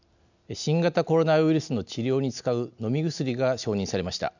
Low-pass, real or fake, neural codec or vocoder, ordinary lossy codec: 7.2 kHz; real; none; none